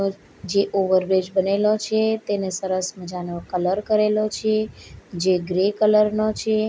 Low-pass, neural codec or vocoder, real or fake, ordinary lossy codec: none; none; real; none